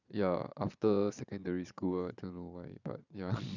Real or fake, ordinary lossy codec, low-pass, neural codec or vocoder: fake; none; 7.2 kHz; vocoder, 22.05 kHz, 80 mel bands, Vocos